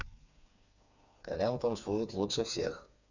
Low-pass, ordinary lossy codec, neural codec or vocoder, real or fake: 7.2 kHz; none; codec, 16 kHz, 4 kbps, FreqCodec, smaller model; fake